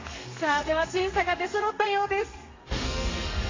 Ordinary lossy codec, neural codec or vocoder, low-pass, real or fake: AAC, 32 kbps; codec, 32 kHz, 1.9 kbps, SNAC; 7.2 kHz; fake